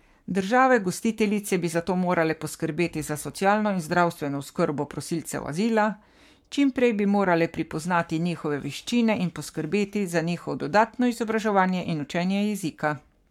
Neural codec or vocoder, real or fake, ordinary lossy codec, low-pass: codec, 44.1 kHz, 7.8 kbps, Pupu-Codec; fake; MP3, 96 kbps; 19.8 kHz